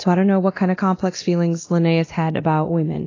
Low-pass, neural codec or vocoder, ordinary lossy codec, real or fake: 7.2 kHz; codec, 24 kHz, 0.9 kbps, DualCodec; AAC, 32 kbps; fake